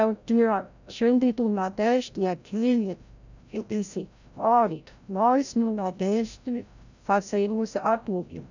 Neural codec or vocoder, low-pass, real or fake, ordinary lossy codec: codec, 16 kHz, 0.5 kbps, FreqCodec, larger model; 7.2 kHz; fake; none